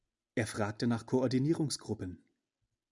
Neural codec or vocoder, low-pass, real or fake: none; 10.8 kHz; real